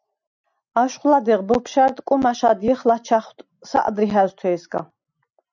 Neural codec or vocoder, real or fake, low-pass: none; real; 7.2 kHz